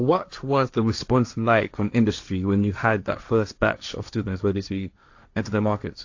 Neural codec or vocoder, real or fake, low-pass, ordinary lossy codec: codec, 16 kHz, 1.1 kbps, Voila-Tokenizer; fake; 7.2 kHz; AAC, 48 kbps